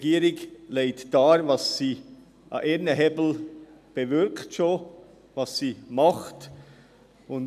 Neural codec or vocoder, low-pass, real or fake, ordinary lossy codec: none; 14.4 kHz; real; none